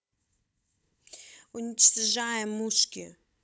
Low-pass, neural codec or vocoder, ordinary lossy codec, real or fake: none; codec, 16 kHz, 16 kbps, FunCodec, trained on Chinese and English, 50 frames a second; none; fake